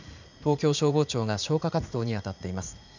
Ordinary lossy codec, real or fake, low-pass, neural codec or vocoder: none; fake; 7.2 kHz; vocoder, 44.1 kHz, 80 mel bands, Vocos